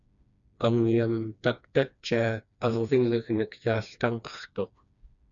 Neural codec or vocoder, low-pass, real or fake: codec, 16 kHz, 2 kbps, FreqCodec, smaller model; 7.2 kHz; fake